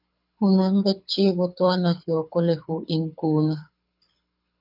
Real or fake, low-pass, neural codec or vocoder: fake; 5.4 kHz; codec, 24 kHz, 6 kbps, HILCodec